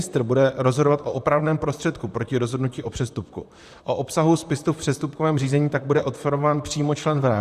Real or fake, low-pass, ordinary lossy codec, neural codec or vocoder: fake; 14.4 kHz; Opus, 64 kbps; vocoder, 44.1 kHz, 128 mel bands, Pupu-Vocoder